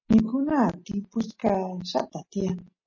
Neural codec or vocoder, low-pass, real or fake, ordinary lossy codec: none; 7.2 kHz; real; MP3, 32 kbps